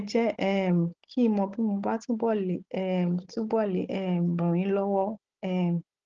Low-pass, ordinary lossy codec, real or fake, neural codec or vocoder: 7.2 kHz; Opus, 16 kbps; fake; codec, 16 kHz, 16 kbps, FunCodec, trained on Chinese and English, 50 frames a second